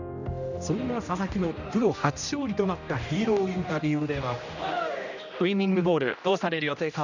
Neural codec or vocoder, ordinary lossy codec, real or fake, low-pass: codec, 16 kHz, 1 kbps, X-Codec, HuBERT features, trained on general audio; none; fake; 7.2 kHz